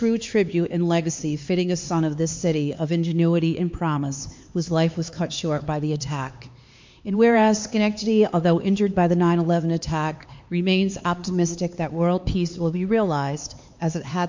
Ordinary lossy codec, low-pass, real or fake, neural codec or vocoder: MP3, 48 kbps; 7.2 kHz; fake; codec, 16 kHz, 4 kbps, X-Codec, HuBERT features, trained on LibriSpeech